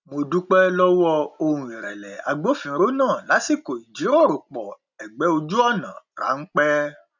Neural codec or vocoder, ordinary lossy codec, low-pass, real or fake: none; none; 7.2 kHz; real